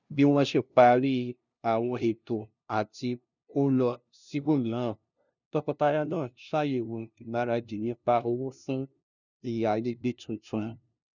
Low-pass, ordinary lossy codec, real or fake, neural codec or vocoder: 7.2 kHz; none; fake; codec, 16 kHz, 0.5 kbps, FunCodec, trained on LibriTTS, 25 frames a second